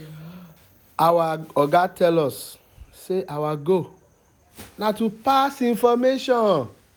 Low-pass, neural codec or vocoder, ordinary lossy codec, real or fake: none; none; none; real